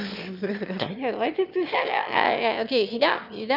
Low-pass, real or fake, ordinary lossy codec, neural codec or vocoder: 5.4 kHz; fake; none; autoencoder, 22.05 kHz, a latent of 192 numbers a frame, VITS, trained on one speaker